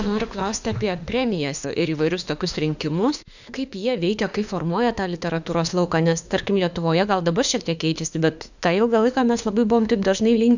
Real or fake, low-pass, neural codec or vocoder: fake; 7.2 kHz; autoencoder, 48 kHz, 32 numbers a frame, DAC-VAE, trained on Japanese speech